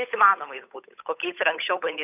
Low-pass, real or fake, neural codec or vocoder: 3.6 kHz; fake; codec, 16 kHz, 8 kbps, FunCodec, trained on Chinese and English, 25 frames a second